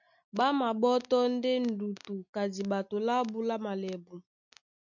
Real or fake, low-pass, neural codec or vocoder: real; 7.2 kHz; none